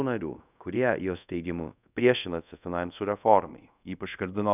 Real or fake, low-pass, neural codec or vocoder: fake; 3.6 kHz; codec, 24 kHz, 0.5 kbps, DualCodec